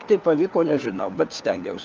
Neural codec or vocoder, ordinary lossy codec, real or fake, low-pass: codec, 16 kHz, 4 kbps, FunCodec, trained on LibriTTS, 50 frames a second; Opus, 24 kbps; fake; 7.2 kHz